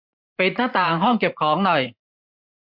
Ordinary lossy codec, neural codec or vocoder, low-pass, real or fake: MP3, 48 kbps; vocoder, 44.1 kHz, 128 mel bands every 512 samples, BigVGAN v2; 5.4 kHz; fake